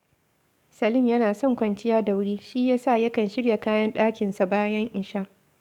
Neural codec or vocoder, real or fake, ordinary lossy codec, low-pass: codec, 44.1 kHz, 7.8 kbps, DAC; fake; none; 19.8 kHz